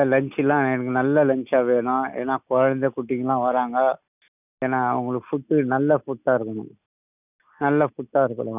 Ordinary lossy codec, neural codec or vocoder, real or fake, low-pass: none; none; real; 3.6 kHz